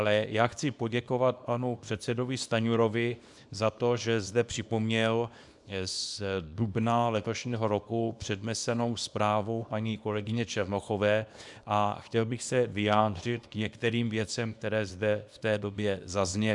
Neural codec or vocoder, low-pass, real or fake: codec, 24 kHz, 0.9 kbps, WavTokenizer, small release; 10.8 kHz; fake